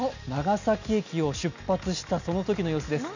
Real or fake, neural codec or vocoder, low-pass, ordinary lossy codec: real; none; 7.2 kHz; none